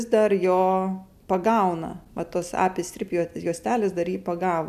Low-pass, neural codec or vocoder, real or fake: 14.4 kHz; none; real